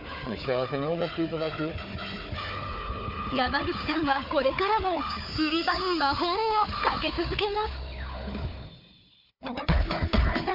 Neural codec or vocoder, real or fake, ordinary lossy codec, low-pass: codec, 16 kHz, 4 kbps, FunCodec, trained on Chinese and English, 50 frames a second; fake; MP3, 48 kbps; 5.4 kHz